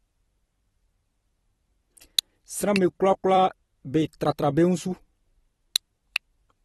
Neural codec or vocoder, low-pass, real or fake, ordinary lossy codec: vocoder, 44.1 kHz, 128 mel bands every 256 samples, BigVGAN v2; 19.8 kHz; fake; AAC, 32 kbps